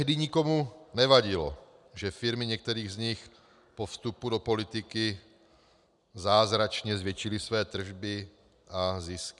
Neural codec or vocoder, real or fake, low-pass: none; real; 10.8 kHz